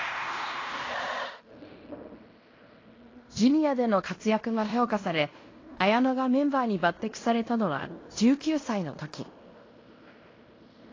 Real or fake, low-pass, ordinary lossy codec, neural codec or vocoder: fake; 7.2 kHz; AAC, 32 kbps; codec, 16 kHz in and 24 kHz out, 0.9 kbps, LongCat-Audio-Codec, fine tuned four codebook decoder